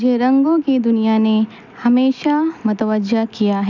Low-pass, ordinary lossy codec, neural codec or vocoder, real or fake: 7.2 kHz; none; none; real